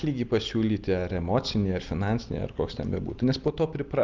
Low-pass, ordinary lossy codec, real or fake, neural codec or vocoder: 7.2 kHz; Opus, 32 kbps; real; none